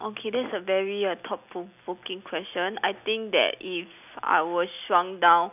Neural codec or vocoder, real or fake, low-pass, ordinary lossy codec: none; real; 3.6 kHz; none